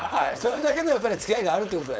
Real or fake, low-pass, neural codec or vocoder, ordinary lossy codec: fake; none; codec, 16 kHz, 4.8 kbps, FACodec; none